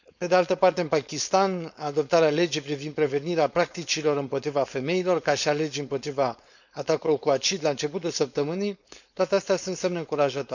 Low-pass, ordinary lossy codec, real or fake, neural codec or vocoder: 7.2 kHz; none; fake; codec, 16 kHz, 4.8 kbps, FACodec